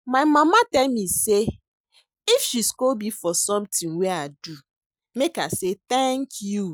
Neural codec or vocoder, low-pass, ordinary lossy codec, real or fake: none; none; none; real